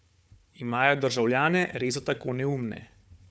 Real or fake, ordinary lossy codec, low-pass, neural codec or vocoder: fake; none; none; codec, 16 kHz, 4 kbps, FunCodec, trained on Chinese and English, 50 frames a second